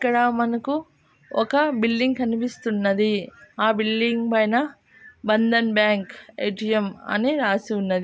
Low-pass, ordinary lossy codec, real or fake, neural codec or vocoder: none; none; real; none